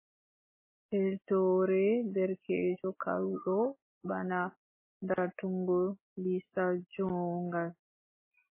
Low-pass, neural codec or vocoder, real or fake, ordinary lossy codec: 3.6 kHz; none; real; MP3, 16 kbps